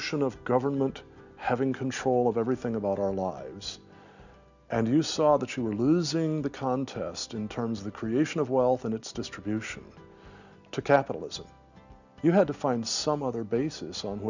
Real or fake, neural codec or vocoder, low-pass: real; none; 7.2 kHz